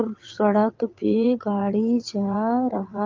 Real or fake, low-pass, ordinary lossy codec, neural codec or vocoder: fake; 7.2 kHz; Opus, 32 kbps; vocoder, 22.05 kHz, 80 mel bands, WaveNeXt